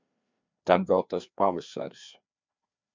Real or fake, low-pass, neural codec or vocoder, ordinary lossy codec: fake; 7.2 kHz; codec, 16 kHz, 2 kbps, FreqCodec, larger model; MP3, 64 kbps